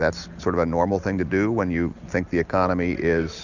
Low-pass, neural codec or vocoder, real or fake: 7.2 kHz; none; real